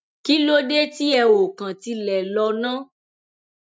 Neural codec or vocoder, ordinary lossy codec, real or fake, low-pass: none; none; real; none